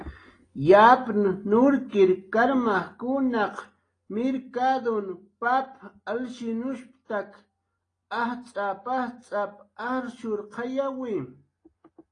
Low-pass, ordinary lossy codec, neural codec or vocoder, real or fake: 9.9 kHz; AAC, 32 kbps; none; real